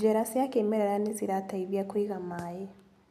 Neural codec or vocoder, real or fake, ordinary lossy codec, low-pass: none; real; none; 14.4 kHz